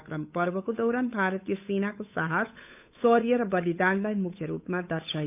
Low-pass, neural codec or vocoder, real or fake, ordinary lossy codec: 3.6 kHz; codec, 16 kHz, 8 kbps, FunCodec, trained on Chinese and English, 25 frames a second; fake; none